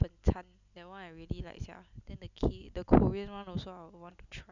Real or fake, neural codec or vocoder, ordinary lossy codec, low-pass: real; none; none; 7.2 kHz